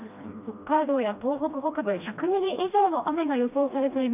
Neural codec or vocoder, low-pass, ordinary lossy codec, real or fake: codec, 16 kHz, 1 kbps, FreqCodec, smaller model; 3.6 kHz; none; fake